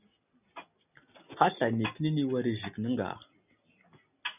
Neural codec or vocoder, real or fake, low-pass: none; real; 3.6 kHz